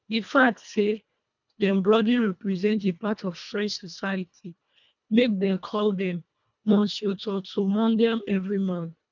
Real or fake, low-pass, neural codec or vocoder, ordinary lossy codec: fake; 7.2 kHz; codec, 24 kHz, 1.5 kbps, HILCodec; none